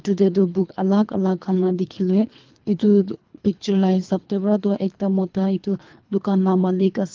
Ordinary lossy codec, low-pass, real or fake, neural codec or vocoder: Opus, 32 kbps; 7.2 kHz; fake; codec, 24 kHz, 3 kbps, HILCodec